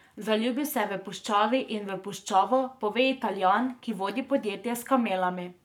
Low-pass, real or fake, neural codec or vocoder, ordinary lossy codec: 19.8 kHz; fake; codec, 44.1 kHz, 7.8 kbps, Pupu-Codec; none